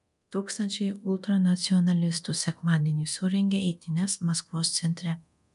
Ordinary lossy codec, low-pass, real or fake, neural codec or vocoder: MP3, 96 kbps; 10.8 kHz; fake; codec, 24 kHz, 0.9 kbps, DualCodec